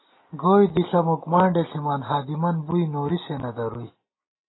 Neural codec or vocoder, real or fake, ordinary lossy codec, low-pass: none; real; AAC, 16 kbps; 7.2 kHz